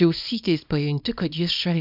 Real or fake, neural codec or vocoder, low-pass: fake; codec, 24 kHz, 0.9 kbps, WavTokenizer, small release; 5.4 kHz